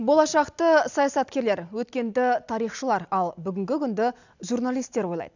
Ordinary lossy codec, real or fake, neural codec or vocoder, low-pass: none; real; none; 7.2 kHz